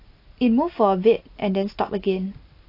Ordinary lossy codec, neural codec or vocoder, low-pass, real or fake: AAC, 32 kbps; none; 5.4 kHz; real